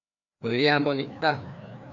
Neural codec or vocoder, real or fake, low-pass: codec, 16 kHz, 2 kbps, FreqCodec, larger model; fake; 7.2 kHz